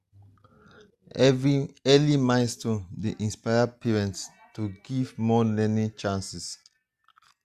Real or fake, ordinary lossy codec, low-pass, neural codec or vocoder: real; Opus, 64 kbps; 14.4 kHz; none